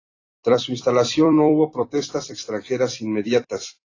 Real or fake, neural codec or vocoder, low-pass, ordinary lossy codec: fake; vocoder, 44.1 kHz, 128 mel bands every 256 samples, BigVGAN v2; 7.2 kHz; AAC, 32 kbps